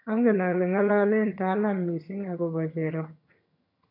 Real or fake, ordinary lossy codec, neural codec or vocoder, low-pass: fake; none; vocoder, 22.05 kHz, 80 mel bands, HiFi-GAN; 5.4 kHz